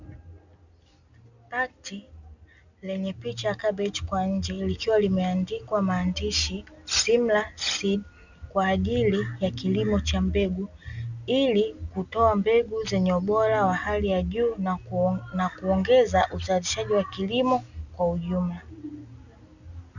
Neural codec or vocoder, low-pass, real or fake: none; 7.2 kHz; real